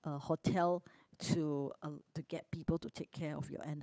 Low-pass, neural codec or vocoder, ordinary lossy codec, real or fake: none; codec, 16 kHz, 8 kbps, FunCodec, trained on Chinese and English, 25 frames a second; none; fake